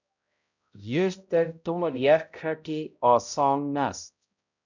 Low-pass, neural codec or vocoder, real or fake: 7.2 kHz; codec, 16 kHz, 0.5 kbps, X-Codec, HuBERT features, trained on balanced general audio; fake